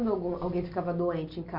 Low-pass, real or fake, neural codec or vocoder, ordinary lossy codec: 5.4 kHz; real; none; MP3, 48 kbps